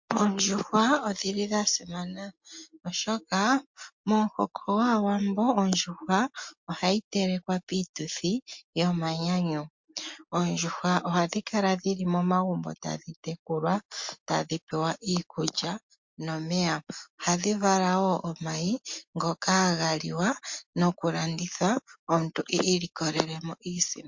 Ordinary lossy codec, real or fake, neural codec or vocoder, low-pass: MP3, 48 kbps; real; none; 7.2 kHz